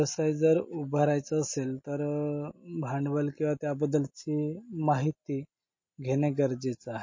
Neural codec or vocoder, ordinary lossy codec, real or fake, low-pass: none; MP3, 32 kbps; real; 7.2 kHz